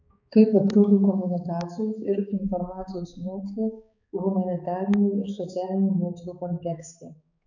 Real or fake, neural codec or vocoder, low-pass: fake; codec, 16 kHz, 4 kbps, X-Codec, HuBERT features, trained on balanced general audio; 7.2 kHz